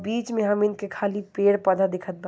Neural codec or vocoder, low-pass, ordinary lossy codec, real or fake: none; none; none; real